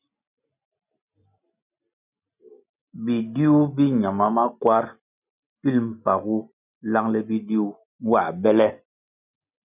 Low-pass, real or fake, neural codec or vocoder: 3.6 kHz; real; none